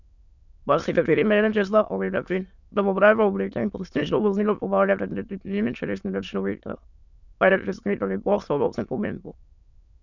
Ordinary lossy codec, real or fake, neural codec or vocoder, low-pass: none; fake; autoencoder, 22.05 kHz, a latent of 192 numbers a frame, VITS, trained on many speakers; 7.2 kHz